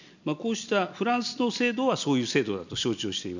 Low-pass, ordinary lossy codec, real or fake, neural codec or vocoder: 7.2 kHz; none; real; none